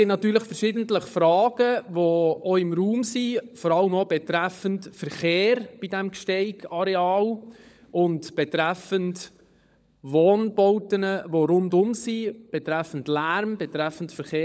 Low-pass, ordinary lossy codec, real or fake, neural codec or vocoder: none; none; fake; codec, 16 kHz, 16 kbps, FunCodec, trained on LibriTTS, 50 frames a second